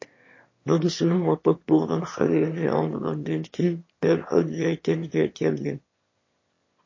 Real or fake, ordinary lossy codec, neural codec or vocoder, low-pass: fake; MP3, 32 kbps; autoencoder, 22.05 kHz, a latent of 192 numbers a frame, VITS, trained on one speaker; 7.2 kHz